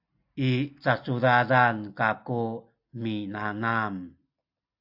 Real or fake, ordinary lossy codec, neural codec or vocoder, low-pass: real; AAC, 48 kbps; none; 5.4 kHz